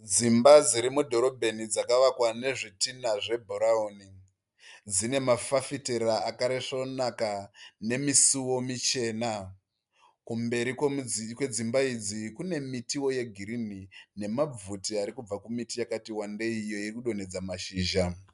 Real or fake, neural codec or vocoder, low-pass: real; none; 10.8 kHz